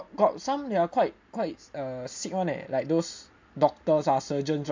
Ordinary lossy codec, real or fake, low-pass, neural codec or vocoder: MP3, 64 kbps; real; 7.2 kHz; none